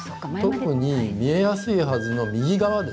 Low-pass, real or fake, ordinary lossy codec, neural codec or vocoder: none; real; none; none